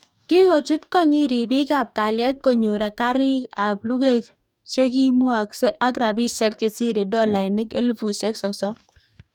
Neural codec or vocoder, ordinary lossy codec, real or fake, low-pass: codec, 44.1 kHz, 2.6 kbps, DAC; none; fake; 19.8 kHz